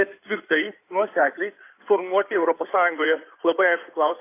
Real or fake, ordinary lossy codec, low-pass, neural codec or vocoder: fake; AAC, 24 kbps; 3.6 kHz; codec, 16 kHz in and 24 kHz out, 2.2 kbps, FireRedTTS-2 codec